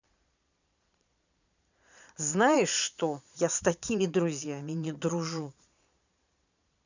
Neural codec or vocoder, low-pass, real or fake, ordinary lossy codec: vocoder, 22.05 kHz, 80 mel bands, Vocos; 7.2 kHz; fake; none